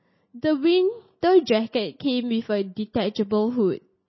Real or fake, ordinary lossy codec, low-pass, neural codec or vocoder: real; MP3, 24 kbps; 7.2 kHz; none